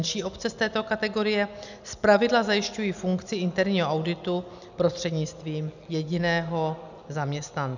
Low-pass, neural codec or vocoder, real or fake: 7.2 kHz; none; real